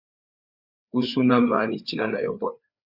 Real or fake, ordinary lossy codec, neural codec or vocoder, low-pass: fake; Opus, 64 kbps; codec, 16 kHz, 4 kbps, FreqCodec, larger model; 5.4 kHz